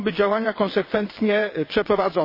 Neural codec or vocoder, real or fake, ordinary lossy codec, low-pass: vocoder, 22.05 kHz, 80 mel bands, WaveNeXt; fake; MP3, 24 kbps; 5.4 kHz